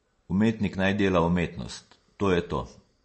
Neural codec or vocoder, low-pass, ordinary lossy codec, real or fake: none; 10.8 kHz; MP3, 32 kbps; real